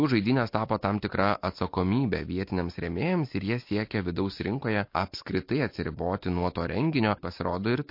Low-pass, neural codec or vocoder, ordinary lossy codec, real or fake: 5.4 kHz; none; MP3, 32 kbps; real